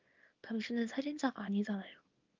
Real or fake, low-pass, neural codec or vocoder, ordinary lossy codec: fake; 7.2 kHz; codec, 24 kHz, 0.9 kbps, WavTokenizer, small release; Opus, 24 kbps